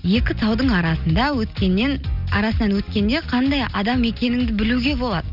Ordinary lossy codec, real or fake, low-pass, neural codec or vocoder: none; real; 5.4 kHz; none